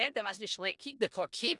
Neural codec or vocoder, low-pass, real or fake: codec, 16 kHz in and 24 kHz out, 0.4 kbps, LongCat-Audio-Codec, four codebook decoder; 10.8 kHz; fake